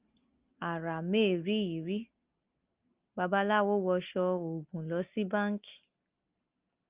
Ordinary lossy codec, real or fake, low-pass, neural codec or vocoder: Opus, 24 kbps; real; 3.6 kHz; none